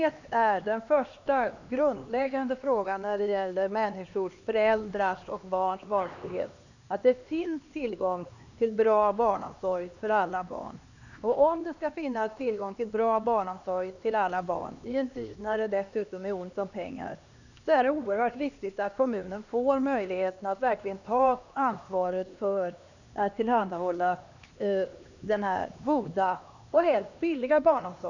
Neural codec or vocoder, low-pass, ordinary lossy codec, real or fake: codec, 16 kHz, 2 kbps, X-Codec, HuBERT features, trained on LibriSpeech; 7.2 kHz; none; fake